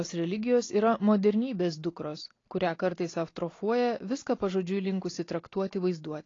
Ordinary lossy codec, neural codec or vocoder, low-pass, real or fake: AAC, 32 kbps; none; 7.2 kHz; real